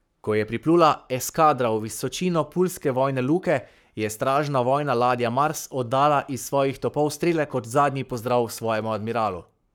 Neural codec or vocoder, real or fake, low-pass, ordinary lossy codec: codec, 44.1 kHz, 7.8 kbps, Pupu-Codec; fake; none; none